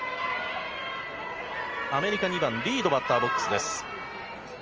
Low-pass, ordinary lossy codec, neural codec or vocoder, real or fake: 7.2 kHz; Opus, 24 kbps; none; real